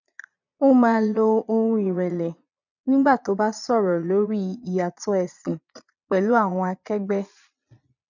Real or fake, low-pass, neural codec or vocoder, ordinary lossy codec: fake; 7.2 kHz; vocoder, 22.05 kHz, 80 mel bands, Vocos; none